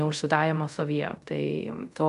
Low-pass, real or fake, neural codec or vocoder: 10.8 kHz; fake; codec, 24 kHz, 0.5 kbps, DualCodec